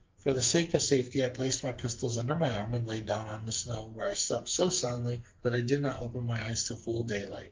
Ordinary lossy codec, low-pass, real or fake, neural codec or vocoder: Opus, 32 kbps; 7.2 kHz; fake; codec, 44.1 kHz, 2.6 kbps, SNAC